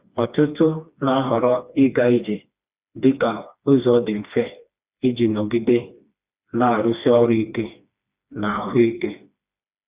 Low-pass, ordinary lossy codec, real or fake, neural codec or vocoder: 3.6 kHz; Opus, 64 kbps; fake; codec, 16 kHz, 2 kbps, FreqCodec, smaller model